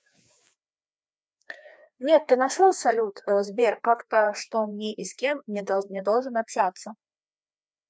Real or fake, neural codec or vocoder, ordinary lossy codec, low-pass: fake; codec, 16 kHz, 2 kbps, FreqCodec, larger model; none; none